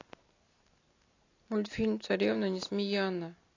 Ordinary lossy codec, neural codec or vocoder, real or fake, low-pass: AAC, 32 kbps; none; real; 7.2 kHz